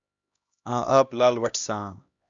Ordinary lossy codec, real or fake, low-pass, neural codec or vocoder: Opus, 64 kbps; fake; 7.2 kHz; codec, 16 kHz, 1 kbps, X-Codec, HuBERT features, trained on LibriSpeech